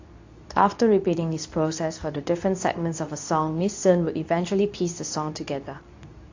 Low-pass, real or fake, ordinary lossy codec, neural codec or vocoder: 7.2 kHz; fake; AAC, 48 kbps; codec, 24 kHz, 0.9 kbps, WavTokenizer, medium speech release version 2